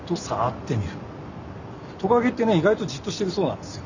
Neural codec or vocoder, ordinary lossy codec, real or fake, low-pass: none; none; real; 7.2 kHz